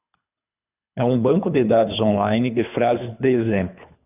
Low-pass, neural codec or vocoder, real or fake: 3.6 kHz; codec, 24 kHz, 3 kbps, HILCodec; fake